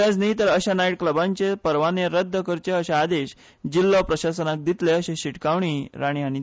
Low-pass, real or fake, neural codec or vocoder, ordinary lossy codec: none; real; none; none